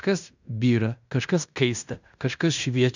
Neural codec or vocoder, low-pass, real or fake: codec, 16 kHz in and 24 kHz out, 0.9 kbps, LongCat-Audio-Codec, fine tuned four codebook decoder; 7.2 kHz; fake